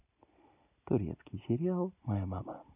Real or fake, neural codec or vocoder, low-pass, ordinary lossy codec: real; none; 3.6 kHz; none